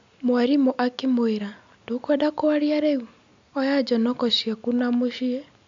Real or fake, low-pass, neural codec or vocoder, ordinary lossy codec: real; 7.2 kHz; none; AAC, 64 kbps